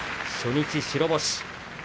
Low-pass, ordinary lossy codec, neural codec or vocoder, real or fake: none; none; none; real